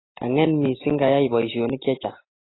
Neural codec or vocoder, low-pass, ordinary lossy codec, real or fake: none; 7.2 kHz; AAC, 16 kbps; real